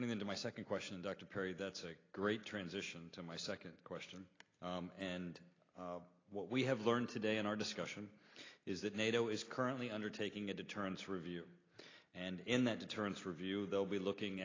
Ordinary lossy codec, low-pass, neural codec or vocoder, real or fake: AAC, 32 kbps; 7.2 kHz; none; real